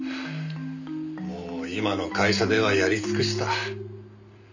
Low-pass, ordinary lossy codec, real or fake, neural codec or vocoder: 7.2 kHz; none; real; none